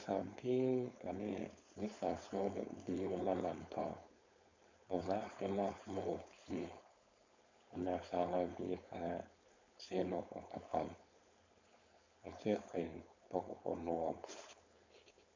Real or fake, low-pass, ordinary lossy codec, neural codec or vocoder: fake; 7.2 kHz; MP3, 64 kbps; codec, 16 kHz, 4.8 kbps, FACodec